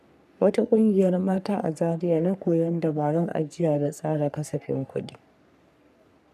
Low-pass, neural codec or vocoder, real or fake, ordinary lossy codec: 14.4 kHz; codec, 44.1 kHz, 3.4 kbps, Pupu-Codec; fake; none